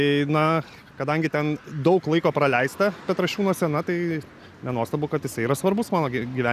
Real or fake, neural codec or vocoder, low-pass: real; none; 14.4 kHz